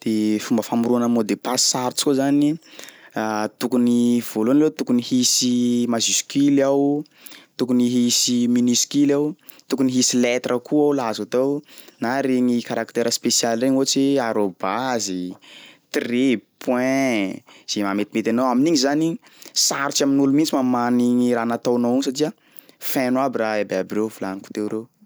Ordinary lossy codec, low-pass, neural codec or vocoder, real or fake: none; none; none; real